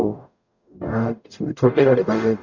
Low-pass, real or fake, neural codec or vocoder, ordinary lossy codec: 7.2 kHz; fake; codec, 44.1 kHz, 0.9 kbps, DAC; none